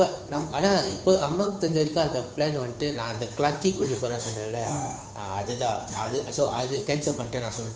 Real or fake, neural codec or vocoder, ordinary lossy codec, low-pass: fake; codec, 16 kHz, 2 kbps, FunCodec, trained on Chinese and English, 25 frames a second; none; none